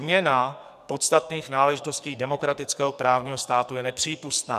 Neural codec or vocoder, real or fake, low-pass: codec, 44.1 kHz, 2.6 kbps, SNAC; fake; 14.4 kHz